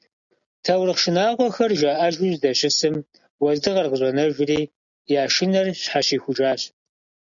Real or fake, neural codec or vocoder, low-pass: real; none; 7.2 kHz